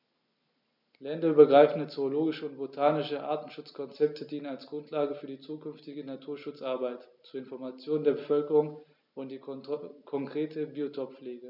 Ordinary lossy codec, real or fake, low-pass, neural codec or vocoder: none; real; 5.4 kHz; none